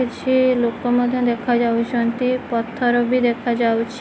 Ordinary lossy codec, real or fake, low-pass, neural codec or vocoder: none; real; none; none